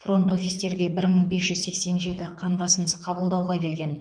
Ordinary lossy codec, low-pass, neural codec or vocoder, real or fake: none; 9.9 kHz; codec, 24 kHz, 3 kbps, HILCodec; fake